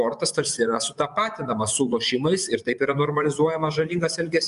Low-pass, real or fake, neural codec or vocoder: 10.8 kHz; fake; vocoder, 24 kHz, 100 mel bands, Vocos